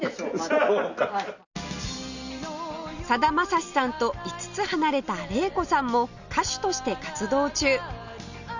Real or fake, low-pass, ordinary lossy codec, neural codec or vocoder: real; 7.2 kHz; none; none